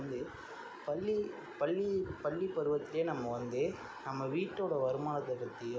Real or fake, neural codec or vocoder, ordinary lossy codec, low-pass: real; none; none; none